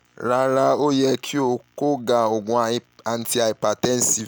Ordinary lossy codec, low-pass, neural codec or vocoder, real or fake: none; none; none; real